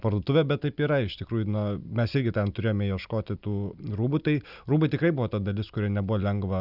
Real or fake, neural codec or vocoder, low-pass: real; none; 5.4 kHz